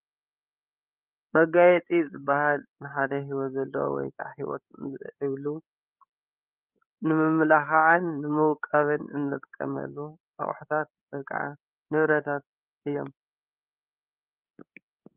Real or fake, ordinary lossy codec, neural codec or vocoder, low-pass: fake; Opus, 24 kbps; codec, 16 kHz, 8 kbps, FreqCodec, larger model; 3.6 kHz